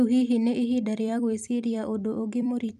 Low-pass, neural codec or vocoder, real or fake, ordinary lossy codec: 14.4 kHz; vocoder, 44.1 kHz, 128 mel bands every 256 samples, BigVGAN v2; fake; none